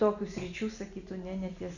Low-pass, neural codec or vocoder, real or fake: 7.2 kHz; none; real